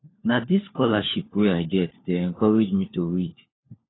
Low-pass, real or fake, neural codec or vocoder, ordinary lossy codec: 7.2 kHz; fake; codec, 16 kHz, 4 kbps, FunCodec, trained on LibriTTS, 50 frames a second; AAC, 16 kbps